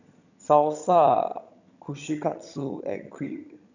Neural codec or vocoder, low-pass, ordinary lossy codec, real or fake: vocoder, 22.05 kHz, 80 mel bands, HiFi-GAN; 7.2 kHz; none; fake